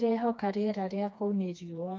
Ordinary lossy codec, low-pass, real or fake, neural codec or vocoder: none; none; fake; codec, 16 kHz, 2 kbps, FreqCodec, smaller model